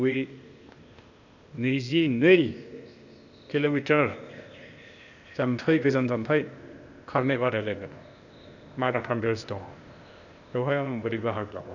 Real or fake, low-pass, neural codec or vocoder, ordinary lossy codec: fake; 7.2 kHz; codec, 16 kHz, 0.8 kbps, ZipCodec; none